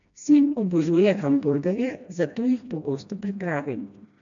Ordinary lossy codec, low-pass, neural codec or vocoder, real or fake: AAC, 64 kbps; 7.2 kHz; codec, 16 kHz, 1 kbps, FreqCodec, smaller model; fake